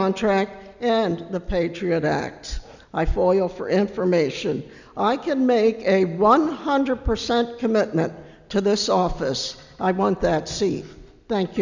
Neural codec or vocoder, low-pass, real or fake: none; 7.2 kHz; real